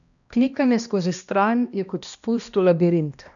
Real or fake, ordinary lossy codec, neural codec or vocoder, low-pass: fake; none; codec, 16 kHz, 1 kbps, X-Codec, HuBERT features, trained on balanced general audio; 7.2 kHz